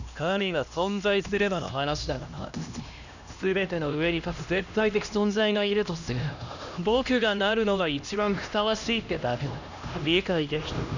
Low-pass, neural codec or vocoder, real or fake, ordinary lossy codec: 7.2 kHz; codec, 16 kHz, 1 kbps, X-Codec, HuBERT features, trained on LibriSpeech; fake; none